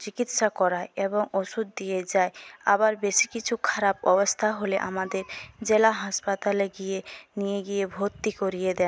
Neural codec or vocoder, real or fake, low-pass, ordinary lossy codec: none; real; none; none